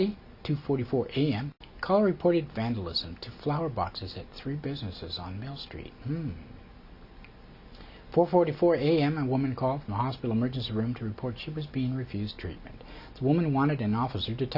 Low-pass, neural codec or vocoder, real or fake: 5.4 kHz; none; real